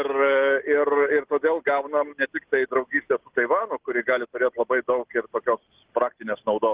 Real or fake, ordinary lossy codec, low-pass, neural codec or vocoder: real; Opus, 16 kbps; 3.6 kHz; none